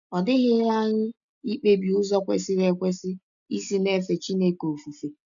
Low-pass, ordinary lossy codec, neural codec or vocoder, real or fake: 7.2 kHz; MP3, 96 kbps; none; real